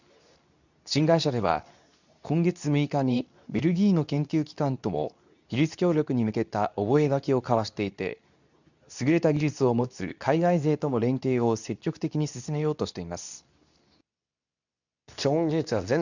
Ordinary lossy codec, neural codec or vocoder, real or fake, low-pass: none; codec, 24 kHz, 0.9 kbps, WavTokenizer, medium speech release version 2; fake; 7.2 kHz